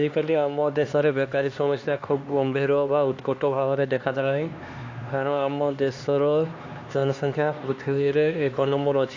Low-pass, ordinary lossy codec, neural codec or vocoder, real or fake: 7.2 kHz; AAC, 48 kbps; codec, 16 kHz, 2 kbps, X-Codec, HuBERT features, trained on LibriSpeech; fake